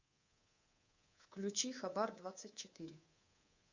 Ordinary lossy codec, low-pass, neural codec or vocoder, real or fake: Opus, 64 kbps; 7.2 kHz; codec, 24 kHz, 3.1 kbps, DualCodec; fake